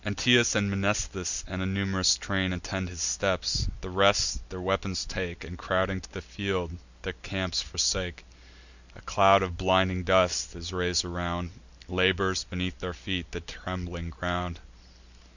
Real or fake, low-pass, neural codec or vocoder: real; 7.2 kHz; none